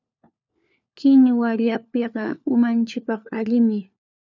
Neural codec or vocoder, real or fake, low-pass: codec, 16 kHz, 4 kbps, FunCodec, trained on LibriTTS, 50 frames a second; fake; 7.2 kHz